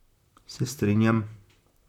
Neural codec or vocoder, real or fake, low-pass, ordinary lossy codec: vocoder, 44.1 kHz, 128 mel bands, Pupu-Vocoder; fake; 19.8 kHz; none